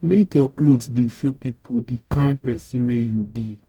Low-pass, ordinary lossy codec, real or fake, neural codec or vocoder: 19.8 kHz; none; fake; codec, 44.1 kHz, 0.9 kbps, DAC